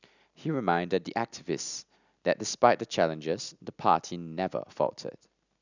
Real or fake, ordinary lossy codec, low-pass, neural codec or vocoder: real; none; 7.2 kHz; none